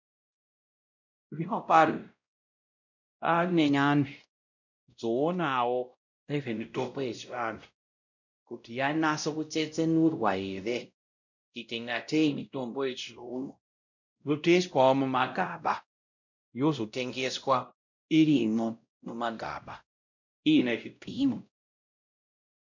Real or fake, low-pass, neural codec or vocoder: fake; 7.2 kHz; codec, 16 kHz, 0.5 kbps, X-Codec, WavLM features, trained on Multilingual LibriSpeech